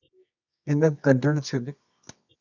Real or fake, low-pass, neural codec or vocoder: fake; 7.2 kHz; codec, 24 kHz, 0.9 kbps, WavTokenizer, medium music audio release